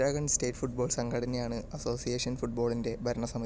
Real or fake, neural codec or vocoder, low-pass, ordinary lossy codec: real; none; none; none